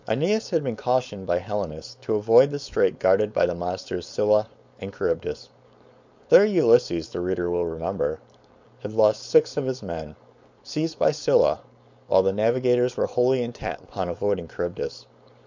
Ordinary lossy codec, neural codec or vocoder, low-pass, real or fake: MP3, 64 kbps; codec, 16 kHz, 4.8 kbps, FACodec; 7.2 kHz; fake